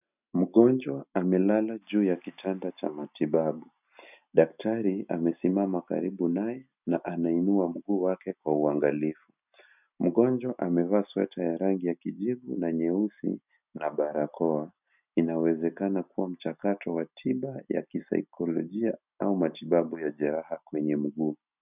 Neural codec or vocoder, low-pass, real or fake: none; 3.6 kHz; real